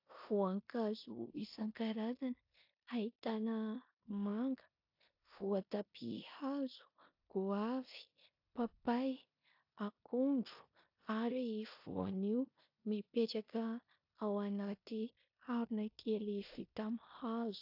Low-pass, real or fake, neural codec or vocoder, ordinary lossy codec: 5.4 kHz; fake; codec, 16 kHz in and 24 kHz out, 0.9 kbps, LongCat-Audio-Codec, four codebook decoder; AAC, 48 kbps